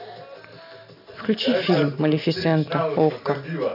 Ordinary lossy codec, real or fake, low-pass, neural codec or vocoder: none; real; 5.4 kHz; none